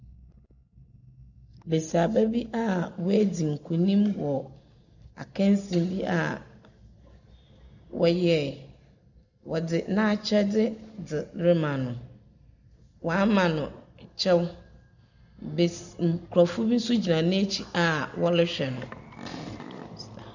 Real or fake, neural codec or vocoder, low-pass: real; none; 7.2 kHz